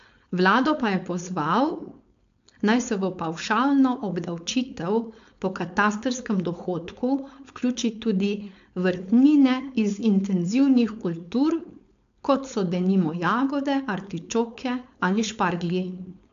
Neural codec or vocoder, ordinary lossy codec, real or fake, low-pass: codec, 16 kHz, 4.8 kbps, FACodec; AAC, 64 kbps; fake; 7.2 kHz